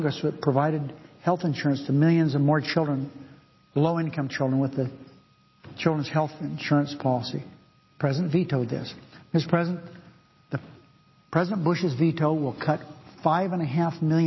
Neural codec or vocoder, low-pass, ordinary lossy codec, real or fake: none; 7.2 kHz; MP3, 24 kbps; real